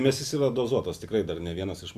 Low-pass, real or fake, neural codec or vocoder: 14.4 kHz; real; none